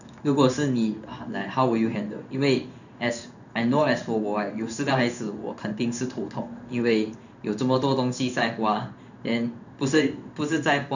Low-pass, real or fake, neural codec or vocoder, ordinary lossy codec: 7.2 kHz; fake; codec, 16 kHz in and 24 kHz out, 1 kbps, XY-Tokenizer; none